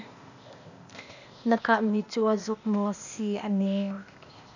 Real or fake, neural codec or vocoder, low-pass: fake; codec, 16 kHz, 0.8 kbps, ZipCodec; 7.2 kHz